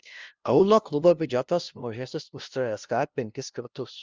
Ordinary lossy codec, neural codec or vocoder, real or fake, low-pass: Opus, 32 kbps; codec, 16 kHz, 0.5 kbps, FunCodec, trained on LibriTTS, 25 frames a second; fake; 7.2 kHz